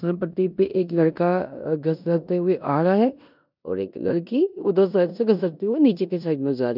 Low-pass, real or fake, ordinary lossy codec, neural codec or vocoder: 5.4 kHz; fake; none; codec, 16 kHz in and 24 kHz out, 0.9 kbps, LongCat-Audio-Codec, four codebook decoder